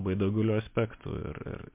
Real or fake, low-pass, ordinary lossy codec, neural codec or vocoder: real; 3.6 kHz; MP3, 32 kbps; none